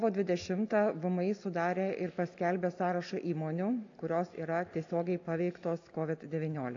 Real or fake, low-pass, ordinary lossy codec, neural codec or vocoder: real; 7.2 kHz; AAC, 48 kbps; none